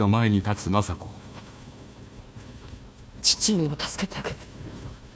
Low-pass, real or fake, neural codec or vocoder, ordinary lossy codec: none; fake; codec, 16 kHz, 1 kbps, FunCodec, trained on Chinese and English, 50 frames a second; none